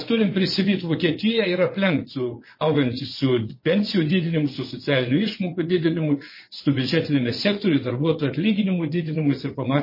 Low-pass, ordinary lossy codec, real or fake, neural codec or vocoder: 5.4 kHz; MP3, 24 kbps; fake; vocoder, 22.05 kHz, 80 mel bands, WaveNeXt